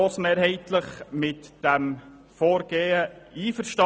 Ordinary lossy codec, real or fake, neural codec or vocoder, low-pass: none; real; none; none